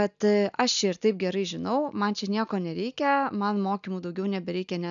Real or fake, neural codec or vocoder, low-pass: real; none; 7.2 kHz